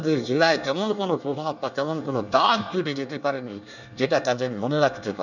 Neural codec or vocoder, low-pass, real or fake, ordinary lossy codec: codec, 24 kHz, 1 kbps, SNAC; 7.2 kHz; fake; none